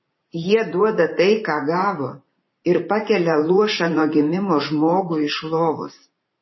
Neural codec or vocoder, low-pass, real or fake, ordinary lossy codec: vocoder, 44.1 kHz, 128 mel bands every 512 samples, BigVGAN v2; 7.2 kHz; fake; MP3, 24 kbps